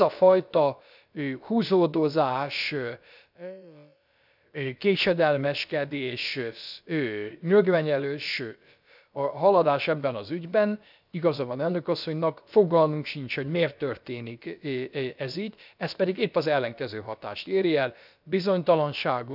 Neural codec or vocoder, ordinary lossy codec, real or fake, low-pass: codec, 16 kHz, about 1 kbps, DyCAST, with the encoder's durations; none; fake; 5.4 kHz